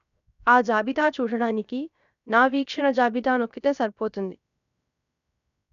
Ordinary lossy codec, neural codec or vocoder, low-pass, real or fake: none; codec, 16 kHz, 0.7 kbps, FocalCodec; 7.2 kHz; fake